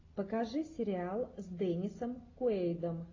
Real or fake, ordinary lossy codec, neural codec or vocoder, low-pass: real; AAC, 32 kbps; none; 7.2 kHz